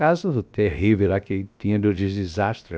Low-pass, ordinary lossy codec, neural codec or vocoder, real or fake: none; none; codec, 16 kHz, about 1 kbps, DyCAST, with the encoder's durations; fake